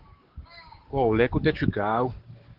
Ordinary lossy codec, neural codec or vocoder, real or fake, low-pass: Opus, 16 kbps; codec, 16 kHz, 6 kbps, DAC; fake; 5.4 kHz